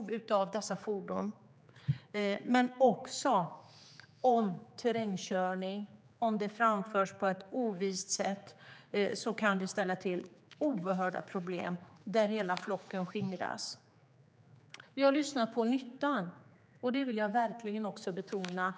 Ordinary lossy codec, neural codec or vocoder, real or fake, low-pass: none; codec, 16 kHz, 4 kbps, X-Codec, HuBERT features, trained on general audio; fake; none